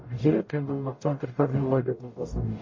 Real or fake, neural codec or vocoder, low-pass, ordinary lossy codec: fake; codec, 44.1 kHz, 0.9 kbps, DAC; 7.2 kHz; MP3, 32 kbps